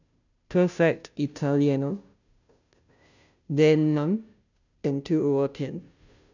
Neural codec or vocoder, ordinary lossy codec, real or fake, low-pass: codec, 16 kHz, 0.5 kbps, FunCodec, trained on Chinese and English, 25 frames a second; none; fake; 7.2 kHz